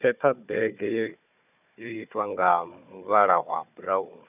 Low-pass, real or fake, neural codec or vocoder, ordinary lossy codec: 3.6 kHz; fake; codec, 16 kHz, 4 kbps, FunCodec, trained on Chinese and English, 50 frames a second; none